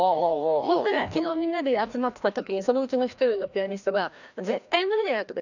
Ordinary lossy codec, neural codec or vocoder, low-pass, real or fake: none; codec, 16 kHz, 1 kbps, FreqCodec, larger model; 7.2 kHz; fake